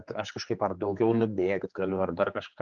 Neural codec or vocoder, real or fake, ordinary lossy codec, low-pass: codec, 16 kHz, 4 kbps, X-Codec, HuBERT features, trained on general audio; fake; Opus, 24 kbps; 7.2 kHz